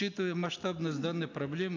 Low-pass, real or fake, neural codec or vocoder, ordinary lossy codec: 7.2 kHz; real; none; MP3, 64 kbps